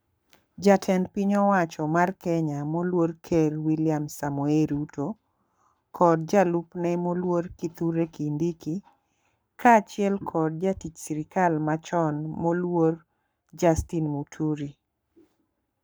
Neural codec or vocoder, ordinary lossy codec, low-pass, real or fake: codec, 44.1 kHz, 7.8 kbps, Pupu-Codec; none; none; fake